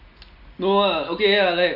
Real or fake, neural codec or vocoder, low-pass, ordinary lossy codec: real; none; 5.4 kHz; none